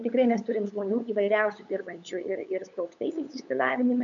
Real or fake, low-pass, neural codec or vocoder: fake; 7.2 kHz; codec, 16 kHz, 8 kbps, FunCodec, trained on LibriTTS, 25 frames a second